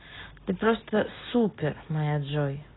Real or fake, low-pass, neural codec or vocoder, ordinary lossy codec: real; 7.2 kHz; none; AAC, 16 kbps